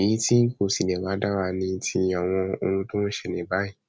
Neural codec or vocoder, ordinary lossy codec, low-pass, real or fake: none; none; none; real